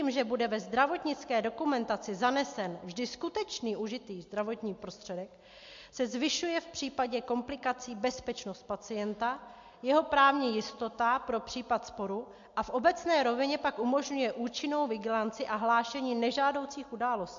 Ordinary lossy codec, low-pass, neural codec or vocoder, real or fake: MP3, 48 kbps; 7.2 kHz; none; real